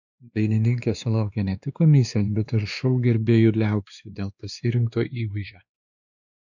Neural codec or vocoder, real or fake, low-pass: codec, 16 kHz, 2 kbps, X-Codec, WavLM features, trained on Multilingual LibriSpeech; fake; 7.2 kHz